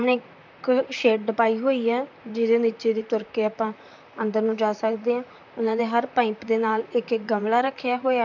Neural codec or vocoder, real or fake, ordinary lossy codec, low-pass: vocoder, 44.1 kHz, 128 mel bands, Pupu-Vocoder; fake; MP3, 64 kbps; 7.2 kHz